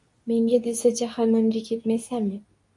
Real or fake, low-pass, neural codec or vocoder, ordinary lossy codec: fake; 10.8 kHz; codec, 24 kHz, 0.9 kbps, WavTokenizer, medium speech release version 2; MP3, 48 kbps